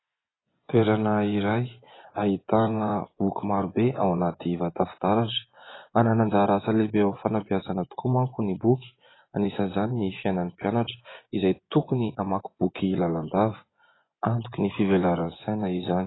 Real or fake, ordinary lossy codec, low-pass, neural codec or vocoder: fake; AAC, 16 kbps; 7.2 kHz; vocoder, 24 kHz, 100 mel bands, Vocos